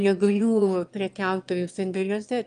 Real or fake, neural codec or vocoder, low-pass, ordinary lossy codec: fake; autoencoder, 22.05 kHz, a latent of 192 numbers a frame, VITS, trained on one speaker; 9.9 kHz; Opus, 32 kbps